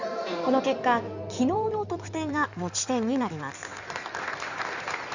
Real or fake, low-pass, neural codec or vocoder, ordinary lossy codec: fake; 7.2 kHz; codec, 16 kHz in and 24 kHz out, 2.2 kbps, FireRedTTS-2 codec; none